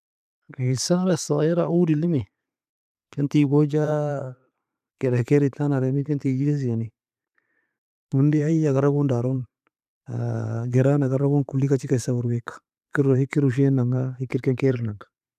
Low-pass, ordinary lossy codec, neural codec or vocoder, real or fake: 14.4 kHz; none; vocoder, 44.1 kHz, 128 mel bands every 512 samples, BigVGAN v2; fake